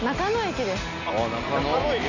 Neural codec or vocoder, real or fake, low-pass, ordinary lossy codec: none; real; 7.2 kHz; none